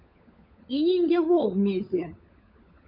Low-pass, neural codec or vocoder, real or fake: 5.4 kHz; codec, 16 kHz, 16 kbps, FunCodec, trained on LibriTTS, 50 frames a second; fake